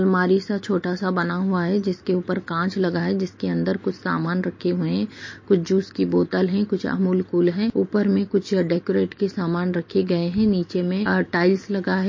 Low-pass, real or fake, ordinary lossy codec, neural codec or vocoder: 7.2 kHz; real; MP3, 32 kbps; none